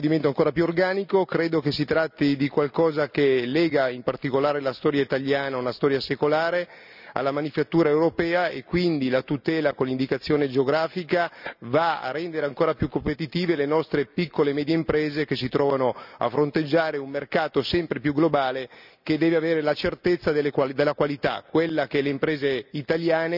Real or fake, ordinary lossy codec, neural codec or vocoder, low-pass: real; none; none; 5.4 kHz